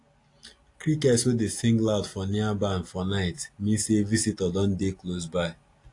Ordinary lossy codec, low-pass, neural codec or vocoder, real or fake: AAC, 48 kbps; 10.8 kHz; none; real